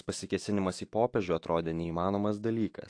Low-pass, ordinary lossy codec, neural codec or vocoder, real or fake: 9.9 kHz; AAC, 48 kbps; autoencoder, 48 kHz, 128 numbers a frame, DAC-VAE, trained on Japanese speech; fake